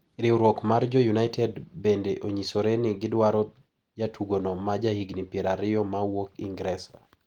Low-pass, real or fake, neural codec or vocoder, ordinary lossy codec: 19.8 kHz; real; none; Opus, 24 kbps